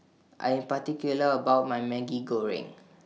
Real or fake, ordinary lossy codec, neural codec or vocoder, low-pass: real; none; none; none